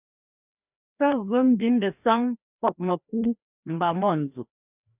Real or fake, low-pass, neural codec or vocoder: fake; 3.6 kHz; codec, 16 kHz, 1 kbps, FreqCodec, larger model